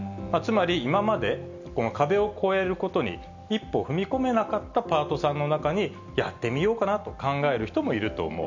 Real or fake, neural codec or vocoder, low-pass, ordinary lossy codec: real; none; 7.2 kHz; none